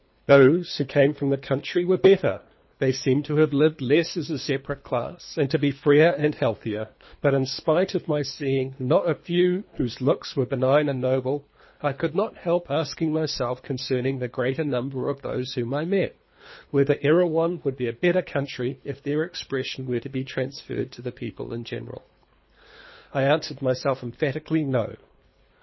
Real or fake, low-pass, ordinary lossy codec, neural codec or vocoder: fake; 7.2 kHz; MP3, 24 kbps; codec, 24 kHz, 3 kbps, HILCodec